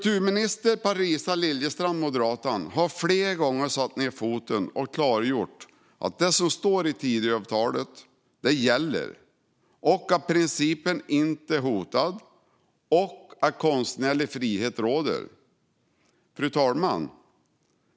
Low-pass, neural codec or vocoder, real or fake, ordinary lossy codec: none; none; real; none